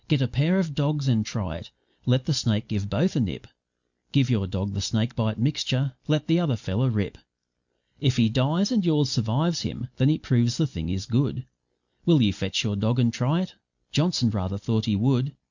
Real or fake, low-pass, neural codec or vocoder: real; 7.2 kHz; none